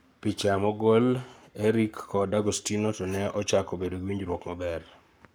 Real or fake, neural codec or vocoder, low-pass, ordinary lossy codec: fake; codec, 44.1 kHz, 7.8 kbps, Pupu-Codec; none; none